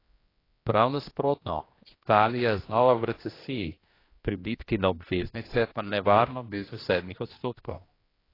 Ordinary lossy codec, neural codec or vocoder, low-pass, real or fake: AAC, 24 kbps; codec, 16 kHz, 1 kbps, X-Codec, HuBERT features, trained on general audio; 5.4 kHz; fake